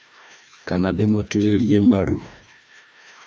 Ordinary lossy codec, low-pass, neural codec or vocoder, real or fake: none; none; codec, 16 kHz, 1 kbps, FreqCodec, larger model; fake